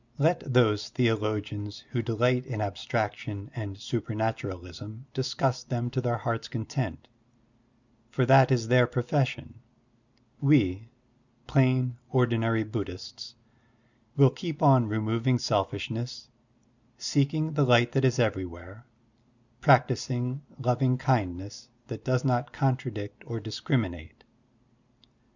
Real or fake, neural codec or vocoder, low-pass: real; none; 7.2 kHz